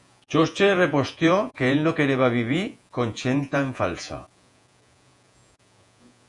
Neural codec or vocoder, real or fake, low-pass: vocoder, 48 kHz, 128 mel bands, Vocos; fake; 10.8 kHz